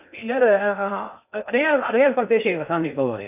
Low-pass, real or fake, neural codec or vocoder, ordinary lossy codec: 3.6 kHz; fake; codec, 16 kHz in and 24 kHz out, 0.6 kbps, FocalCodec, streaming, 2048 codes; none